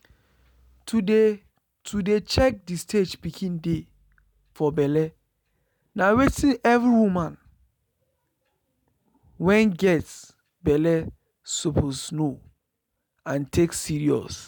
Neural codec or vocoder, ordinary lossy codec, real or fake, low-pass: none; none; real; none